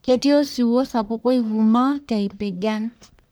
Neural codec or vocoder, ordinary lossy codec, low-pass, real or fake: codec, 44.1 kHz, 1.7 kbps, Pupu-Codec; none; none; fake